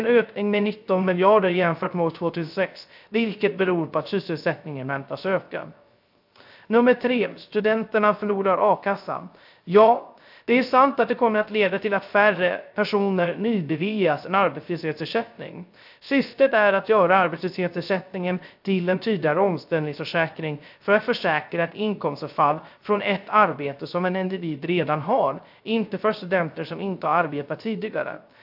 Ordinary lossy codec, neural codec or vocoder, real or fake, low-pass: AAC, 48 kbps; codec, 16 kHz, 0.3 kbps, FocalCodec; fake; 5.4 kHz